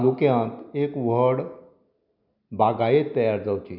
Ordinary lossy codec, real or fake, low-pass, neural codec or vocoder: none; real; 5.4 kHz; none